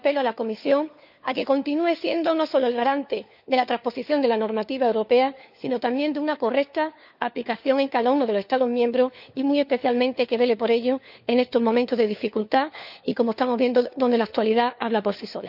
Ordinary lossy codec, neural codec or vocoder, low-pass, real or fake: none; codec, 16 kHz, 4 kbps, FunCodec, trained on LibriTTS, 50 frames a second; 5.4 kHz; fake